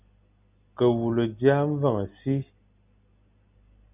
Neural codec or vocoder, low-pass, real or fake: none; 3.6 kHz; real